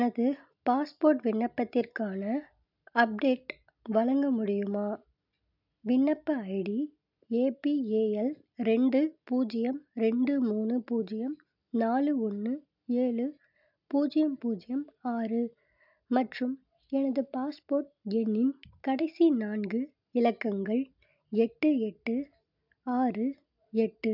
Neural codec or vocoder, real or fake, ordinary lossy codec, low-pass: none; real; none; 5.4 kHz